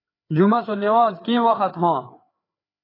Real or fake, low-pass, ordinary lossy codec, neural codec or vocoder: fake; 5.4 kHz; AAC, 24 kbps; codec, 16 kHz, 4 kbps, FreqCodec, larger model